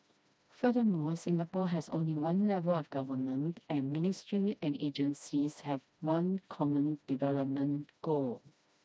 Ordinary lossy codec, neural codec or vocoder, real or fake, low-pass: none; codec, 16 kHz, 1 kbps, FreqCodec, smaller model; fake; none